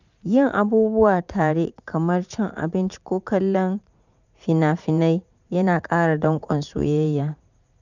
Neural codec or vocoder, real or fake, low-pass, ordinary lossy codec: vocoder, 44.1 kHz, 80 mel bands, Vocos; fake; 7.2 kHz; none